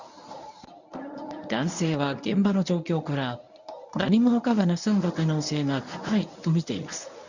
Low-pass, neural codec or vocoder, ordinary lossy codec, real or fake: 7.2 kHz; codec, 24 kHz, 0.9 kbps, WavTokenizer, medium speech release version 1; none; fake